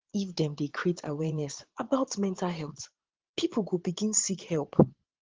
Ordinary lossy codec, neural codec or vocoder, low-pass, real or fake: Opus, 16 kbps; vocoder, 22.05 kHz, 80 mel bands, Vocos; 7.2 kHz; fake